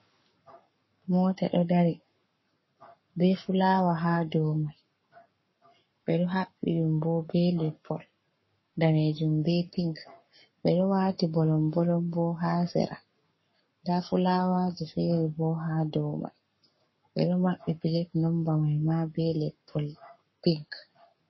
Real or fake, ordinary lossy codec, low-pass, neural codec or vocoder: fake; MP3, 24 kbps; 7.2 kHz; codec, 44.1 kHz, 7.8 kbps, Pupu-Codec